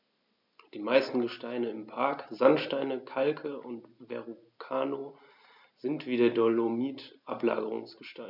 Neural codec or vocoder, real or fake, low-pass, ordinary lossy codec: none; real; 5.4 kHz; none